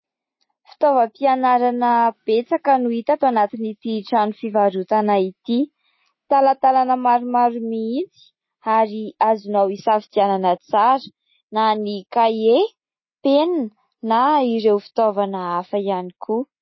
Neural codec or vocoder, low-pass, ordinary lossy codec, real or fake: none; 7.2 kHz; MP3, 24 kbps; real